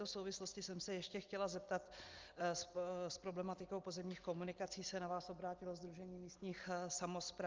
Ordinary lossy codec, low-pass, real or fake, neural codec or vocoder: Opus, 32 kbps; 7.2 kHz; real; none